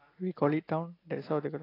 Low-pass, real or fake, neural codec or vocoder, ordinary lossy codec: 5.4 kHz; real; none; AAC, 24 kbps